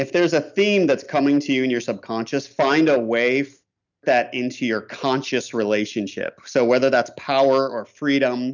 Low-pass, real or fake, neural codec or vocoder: 7.2 kHz; real; none